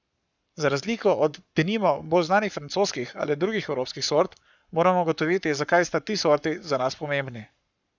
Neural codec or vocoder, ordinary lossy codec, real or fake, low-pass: codec, 44.1 kHz, 7.8 kbps, Pupu-Codec; none; fake; 7.2 kHz